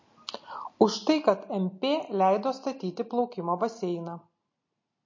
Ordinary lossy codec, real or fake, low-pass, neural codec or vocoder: MP3, 32 kbps; real; 7.2 kHz; none